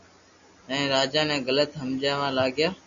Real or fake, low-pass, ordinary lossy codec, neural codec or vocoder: real; 7.2 kHz; Opus, 64 kbps; none